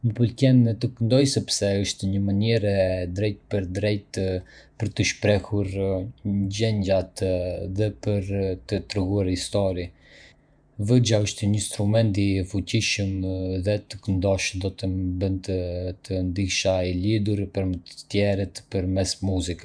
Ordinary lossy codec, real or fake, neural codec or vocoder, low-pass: none; fake; vocoder, 48 kHz, 128 mel bands, Vocos; 9.9 kHz